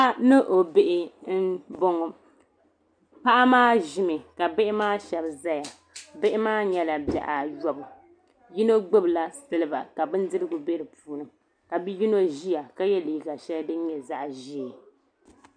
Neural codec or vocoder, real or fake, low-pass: none; real; 9.9 kHz